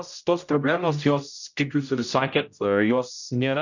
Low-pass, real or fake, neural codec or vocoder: 7.2 kHz; fake; codec, 16 kHz, 0.5 kbps, X-Codec, HuBERT features, trained on general audio